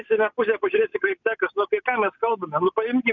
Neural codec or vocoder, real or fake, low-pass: none; real; 7.2 kHz